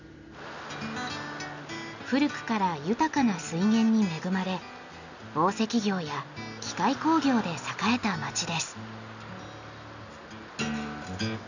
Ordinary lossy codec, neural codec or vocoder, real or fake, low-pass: none; none; real; 7.2 kHz